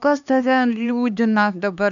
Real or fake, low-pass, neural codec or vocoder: fake; 7.2 kHz; codec, 16 kHz, 2 kbps, X-Codec, HuBERT features, trained on LibriSpeech